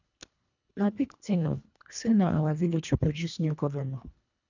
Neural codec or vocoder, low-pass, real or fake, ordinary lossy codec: codec, 24 kHz, 1.5 kbps, HILCodec; 7.2 kHz; fake; none